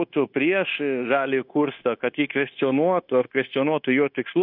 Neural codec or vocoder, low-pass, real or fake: codec, 16 kHz, 0.9 kbps, LongCat-Audio-Codec; 5.4 kHz; fake